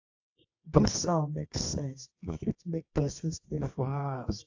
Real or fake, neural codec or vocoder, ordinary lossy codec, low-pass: fake; codec, 24 kHz, 0.9 kbps, WavTokenizer, medium music audio release; AAC, 48 kbps; 7.2 kHz